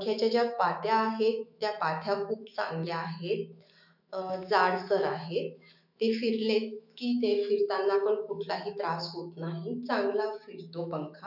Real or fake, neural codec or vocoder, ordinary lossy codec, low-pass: fake; autoencoder, 48 kHz, 128 numbers a frame, DAC-VAE, trained on Japanese speech; none; 5.4 kHz